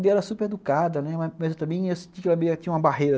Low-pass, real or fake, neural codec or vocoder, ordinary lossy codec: none; real; none; none